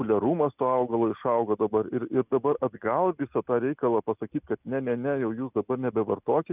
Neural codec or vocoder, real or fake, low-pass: none; real; 3.6 kHz